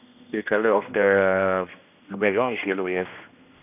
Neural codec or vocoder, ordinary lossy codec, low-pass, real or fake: codec, 16 kHz, 1 kbps, X-Codec, HuBERT features, trained on general audio; none; 3.6 kHz; fake